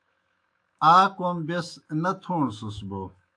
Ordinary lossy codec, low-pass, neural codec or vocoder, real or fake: AAC, 64 kbps; 9.9 kHz; autoencoder, 48 kHz, 128 numbers a frame, DAC-VAE, trained on Japanese speech; fake